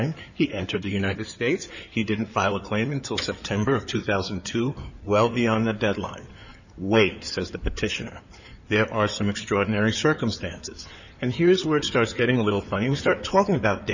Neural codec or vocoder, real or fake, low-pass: codec, 16 kHz in and 24 kHz out, 2.2 kbps, FireRedTTS-2 codec; fake; 7.2 kHz